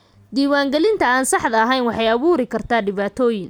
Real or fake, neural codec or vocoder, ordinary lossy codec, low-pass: real; none; none; none